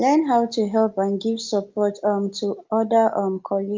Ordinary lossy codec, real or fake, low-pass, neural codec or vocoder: Opus, 24 kbps; real; 7.2 kHz; none